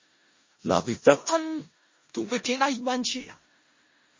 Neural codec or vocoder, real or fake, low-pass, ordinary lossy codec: codec, 16 kHz in and 24 kHz out, 0.4 kbps, LongCat-Audio-Codec, four codebook decoder; fake; 7.2 kHz; MP3, 32 kbps